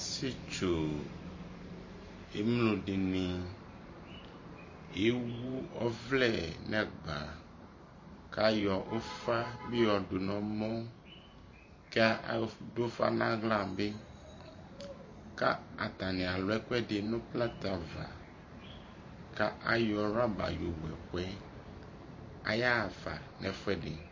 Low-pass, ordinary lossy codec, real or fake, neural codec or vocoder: 7.2 kHz; MP3, 32 kbps; fake; vocoder, 44.1 kHz, 128 mel bands every 512 samples, BigVGAN v2